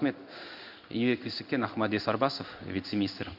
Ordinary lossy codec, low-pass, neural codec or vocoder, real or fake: none; 5.4 kHz; codec, 16 kHz in and 24 kHz out, 1 kbps, XY-Tokenizer; fake